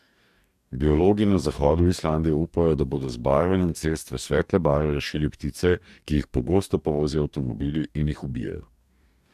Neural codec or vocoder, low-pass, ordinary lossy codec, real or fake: codec, 44.1 kHz, 2.6 kbps, DAC; 14.4 kHz; AAC, 96 kbps; fake